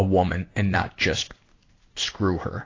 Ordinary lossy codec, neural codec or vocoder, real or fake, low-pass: AAC, 32 kbps; codec, 16 kHz in and 24 kHz out, 1 kbps, XY-Tokenizer; fake; 7.2 kHz